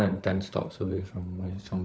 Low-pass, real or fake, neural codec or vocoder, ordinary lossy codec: none; fake; codec, 16 kHz, 16 kbps, FunCodec, trained on LibriTTS, 50 frames a second; none